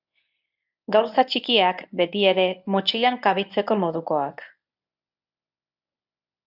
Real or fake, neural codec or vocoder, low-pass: fake; codec, 24 kHz, 0.9 kbps, WavTokenizer, medium speech release version 1; 5.4 kHz